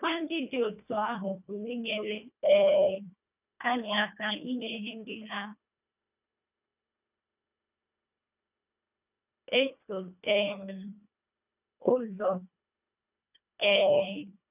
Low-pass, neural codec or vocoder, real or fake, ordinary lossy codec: 3.6 kHz; codec, 24 kHz, 1.5 kbps, HILCodec; fake; none